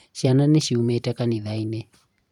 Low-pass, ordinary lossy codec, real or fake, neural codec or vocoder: 19.8 kHz; none; fake; vocoder, 44.1 kHz, 128 mel bands every 512 samples, BigVGAN v2